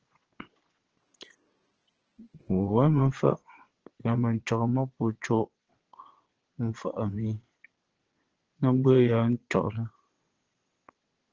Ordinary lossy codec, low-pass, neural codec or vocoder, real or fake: Opus, 16 kbps; 7.2 kHz; vocoder, 22.05 kHz, 80 mel bands, WaveNeXt; fake